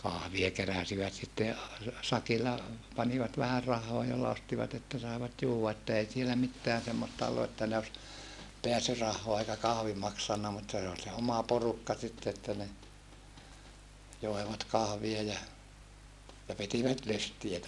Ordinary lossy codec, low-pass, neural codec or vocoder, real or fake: none; none; none; real